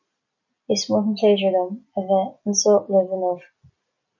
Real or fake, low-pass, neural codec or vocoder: real; 7.2 kHz; none